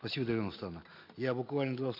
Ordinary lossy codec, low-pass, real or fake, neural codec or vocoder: MP3, 48 kbps; 5.4 kHz; real; none